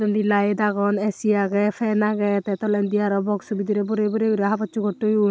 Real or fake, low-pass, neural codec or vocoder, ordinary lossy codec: real; none; none; none